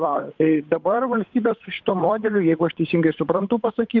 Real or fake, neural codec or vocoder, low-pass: fake; codec, 16 kHz, 8 kbps, FunCodec, trained on Chinese and English, 25 frames a second; 7.2 kHz